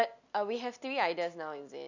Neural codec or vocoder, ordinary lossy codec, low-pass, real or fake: none; none; 7.2 kHz; real